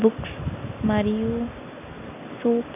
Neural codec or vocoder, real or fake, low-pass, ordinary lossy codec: none; real; 3.6 kHz; none